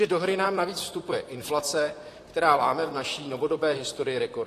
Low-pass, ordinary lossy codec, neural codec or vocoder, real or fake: 14.4 kHz; AAC, 48 kbps; vocoder, 44.1 kHz, 128 mel bands, Pupu-Vocoder; fake